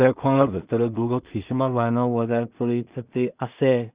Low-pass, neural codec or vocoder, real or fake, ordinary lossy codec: 3.6 kHz; codec, 16 kHz in and 24 kHz out, 0.4 kbps, LongCat-Audio-Codec, two codebook decoder; fake; Opus, 64 kbps